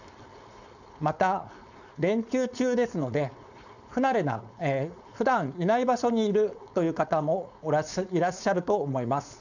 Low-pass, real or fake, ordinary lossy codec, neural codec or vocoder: 7.2 kHz; fake; none; codec, 16 kHz, 4.8 kbps, FACodec